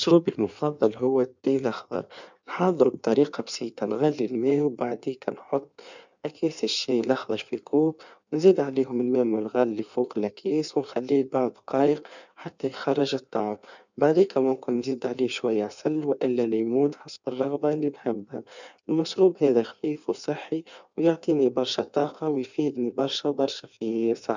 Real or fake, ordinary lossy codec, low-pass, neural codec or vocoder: fake; none; 7.2 kHz; codec, 16 kHz in and 24 kHz out, 1.1 kbps, FireRedTTS-2 codec